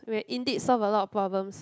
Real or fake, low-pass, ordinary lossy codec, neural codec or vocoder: real; none; none; none